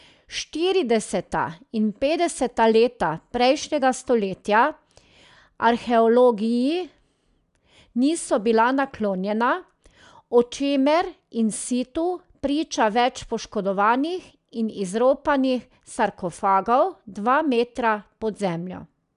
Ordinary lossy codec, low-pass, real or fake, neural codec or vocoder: none; 10.8 kHz; real; none